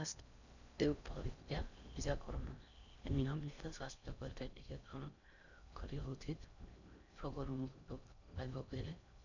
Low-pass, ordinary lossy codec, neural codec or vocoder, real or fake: 7.2 kHz; none; codec, 16 kHz in and 24 kHz out, 0.6 kbps, FocalCodec, streaming, 4096 codes; fake